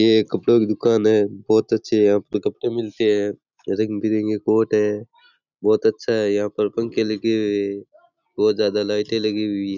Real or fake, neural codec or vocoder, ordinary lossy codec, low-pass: real; none; none; 7.2 kHz